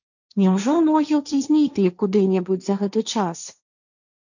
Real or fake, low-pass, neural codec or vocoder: fake; 7.2 kHz; codec, 16 kHz, 1.1 kbps, Voila-Tokenizer